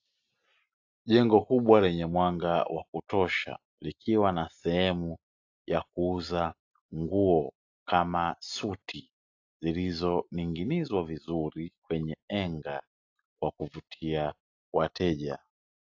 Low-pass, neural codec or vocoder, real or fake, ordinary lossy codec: 7.2 kHz; none; real; AAC, 48 kbps